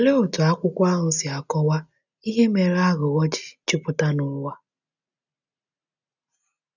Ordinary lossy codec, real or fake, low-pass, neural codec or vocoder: none; real; 7.2 kHz; none